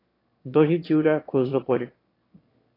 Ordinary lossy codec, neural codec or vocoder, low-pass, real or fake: AAC, 24 kbps; autoencoder, 22.05 kHz, a latent of 192 numbers a frame, VITS, trained on one speaker; 5.4 kHz; fake